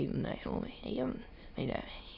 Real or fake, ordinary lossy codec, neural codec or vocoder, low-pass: fake; none; autoencoder, 22.05 kHz, a latent of 192 numbers a frame, VITS, trained on many speakers; 5.4 kHz